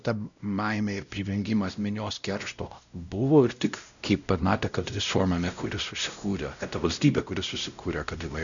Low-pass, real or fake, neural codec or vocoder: 7.2 kHz; fake; codec, 16 kHz, 0.5 kbps, X-Codec, WavLM features, trained on Multilingual LibriSpeech